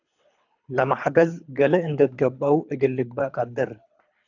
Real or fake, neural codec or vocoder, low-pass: fake; codec, 24 kHz, 3 kbps, HILCodec; 7.2 kHz